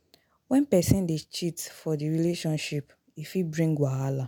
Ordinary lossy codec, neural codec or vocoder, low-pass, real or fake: none; none; none; real